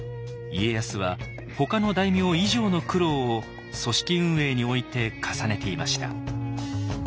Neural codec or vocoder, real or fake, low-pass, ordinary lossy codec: none; real; none; none